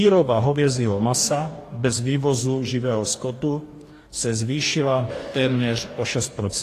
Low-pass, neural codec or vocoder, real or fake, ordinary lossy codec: 14.4 kHz; codec, 44.1 kHz, 2.6 kbps, DAC; fake; AAC, 48 kbps